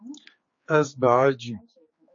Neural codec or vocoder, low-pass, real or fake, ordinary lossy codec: codec, 16 kHz, 4 kbps, X-Codec, HuBERT features, trained on general audio; 7.2 kHz; fake; MP3, 32 kbps